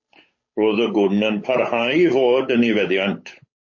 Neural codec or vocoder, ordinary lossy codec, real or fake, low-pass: codec, 16 kHz, 8 kbps, FunCodec, trained on Chinese and English, 25 frames a second; MP3, 32 kbps; fake; 7.2 kHz